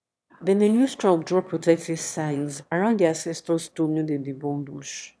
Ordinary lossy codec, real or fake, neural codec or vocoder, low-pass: none; fake; autoencoder, 22.05 kHz, a latent of 192 numbers a frame, VITS, trained on one speaker; none